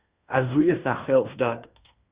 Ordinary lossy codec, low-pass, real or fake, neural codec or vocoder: Opus, 64 kbps; 3.6 kHz; fake; codec, 16 kHz in and 24 kHz out, 0.9 kbps, LongCat-Audio-Codec, fine tuned four codebook decoder